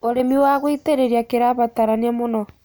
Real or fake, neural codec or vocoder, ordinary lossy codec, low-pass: real; none; none; none